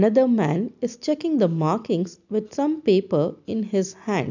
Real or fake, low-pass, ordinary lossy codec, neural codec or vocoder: real; 7.2 kHz; none; none